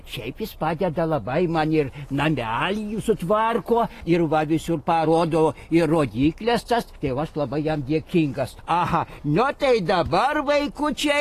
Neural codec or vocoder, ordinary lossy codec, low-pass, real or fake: none; AAC, 48 kbps; 14.4 kHz; real